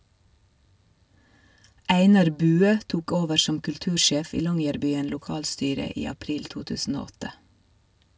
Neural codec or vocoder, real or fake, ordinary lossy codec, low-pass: none; real; none; none